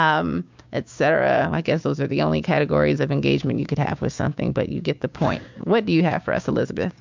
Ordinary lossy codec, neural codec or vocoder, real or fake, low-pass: MP3, 64 kbps; codec, 16 kHz, 6 kbps, DAC; fake; 7.2 kHz